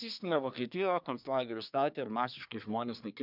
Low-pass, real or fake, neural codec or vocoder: 5.4 kHz; fake; codec, 24 kHz, 1 kbps, SNAC